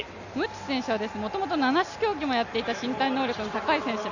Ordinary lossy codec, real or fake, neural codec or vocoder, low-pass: none; real; none; 7.2 kHz